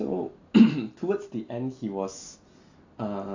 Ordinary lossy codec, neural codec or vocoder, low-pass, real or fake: AAC, 48 kbps; none; 7.2 kHz; real